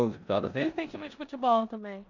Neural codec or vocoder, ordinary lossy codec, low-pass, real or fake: codec, 16 kHz in and 24 kHz out, 0.9 kbps, LongCat-Audio-Codec, four codebook decoder; none; 7.2 kHz; fake